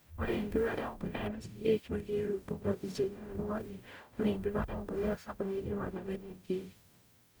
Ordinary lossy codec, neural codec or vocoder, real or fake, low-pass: none; codec, 44.1 kHz, 0.9 kbps, DAC; fake; none